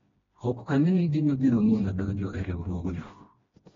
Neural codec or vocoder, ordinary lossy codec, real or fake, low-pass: codec, 16 kHz, 1 kbps, FreqCodec, smaller model; AAC, 24 kbps; fake; 7.2 kHz